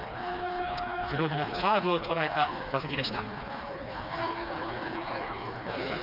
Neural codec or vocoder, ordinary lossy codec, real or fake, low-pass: codec, 16 kHz, 2 kbps, FreqCodec, smaller model; none; fake; 5.4 kHz